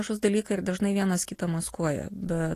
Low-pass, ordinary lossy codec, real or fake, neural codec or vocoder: 14.4 kHz; AAC, 48 kbps; real; none